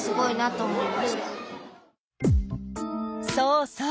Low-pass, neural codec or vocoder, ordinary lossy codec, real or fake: none; none; none; real